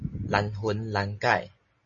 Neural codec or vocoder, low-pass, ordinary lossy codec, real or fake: none; 7.2 kHz; MP3, 32 kbps; real